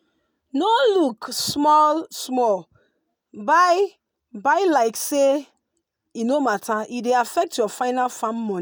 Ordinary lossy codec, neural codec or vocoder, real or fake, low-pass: none; none; real; none